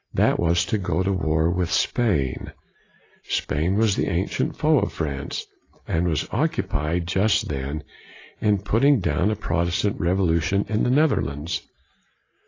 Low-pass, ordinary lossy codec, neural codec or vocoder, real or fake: 7.2 kHz; AAC, 32 kbps; none; real